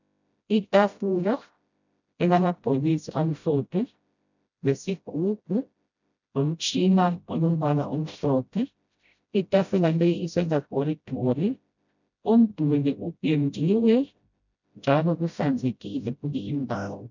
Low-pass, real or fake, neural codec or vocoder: 7.2 kHz; fake; codec, 16 kHz, 0.5 kbps, FreqCodec, smaller model